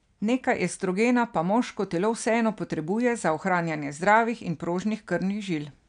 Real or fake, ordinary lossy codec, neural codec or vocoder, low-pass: real; none; none; 9.9 kHz